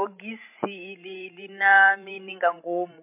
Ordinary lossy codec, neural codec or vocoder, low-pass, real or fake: MP3, 24 kbps; codec, 16 kHz, 16 kbps, FreqCodec, larger model; 3.6 kHz; fake